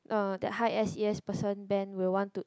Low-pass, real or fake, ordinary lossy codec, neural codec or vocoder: none; real; none; none